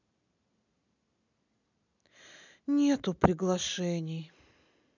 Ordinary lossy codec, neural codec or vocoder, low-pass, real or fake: none; none; 7.2 kHz; real